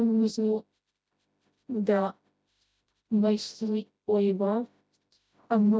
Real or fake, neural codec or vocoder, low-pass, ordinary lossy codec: fake; codec, 16 kHz, 0.5 kbps, FreqCodec, smaller model; none; none